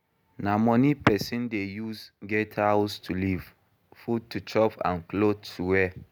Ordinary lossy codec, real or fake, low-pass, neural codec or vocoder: none; real; none; none